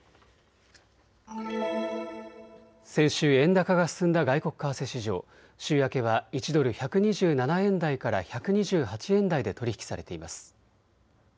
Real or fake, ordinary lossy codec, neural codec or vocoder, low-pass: real; none; none; none